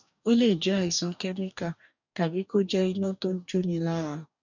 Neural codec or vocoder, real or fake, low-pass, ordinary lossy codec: codec, 44.1 kHz, 2.6 kbps, DAC; fake; 7.2 kHz; none